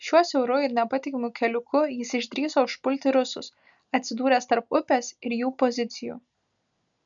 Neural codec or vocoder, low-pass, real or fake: none; 7.2 kHz; real